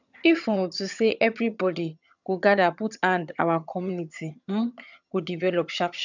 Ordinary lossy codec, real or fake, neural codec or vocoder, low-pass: none; fake; vocoder, 22.05 kHz, 80 mel bands, HiFi-GAN; 7.2 kHz